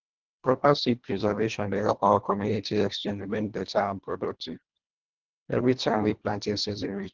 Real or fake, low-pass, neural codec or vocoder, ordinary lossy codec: fake; 7.2 kHz; codec, 24 kHz, 1.5 kbps, HILCodec; Opus, 16 kbps